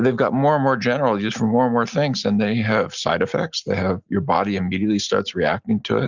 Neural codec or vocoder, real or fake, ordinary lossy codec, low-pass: none; real; Opus, 64 kbps; 7.2 kHz